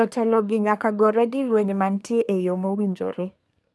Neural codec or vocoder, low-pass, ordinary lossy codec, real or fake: codec, 24 kHz, 1 kbps, SNAC; none; none; fake